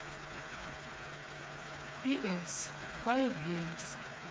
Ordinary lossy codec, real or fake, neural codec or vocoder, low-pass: none; fake; codec, 16 kHz, 4 kbps, FreqCodec, smaller model; none